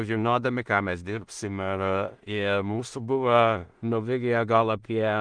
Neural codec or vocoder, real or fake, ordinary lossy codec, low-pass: codec, 16 kHz in and 24 kHz out, 0.4 kbps, LongCat-Audio-Codec, two codebook decoder; fake; Opus, 24 kbps; 9.9 kHz